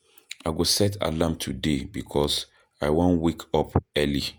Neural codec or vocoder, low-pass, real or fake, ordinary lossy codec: vocoder, 48 kHz, 128 mel bands, Vocos; none; fake; none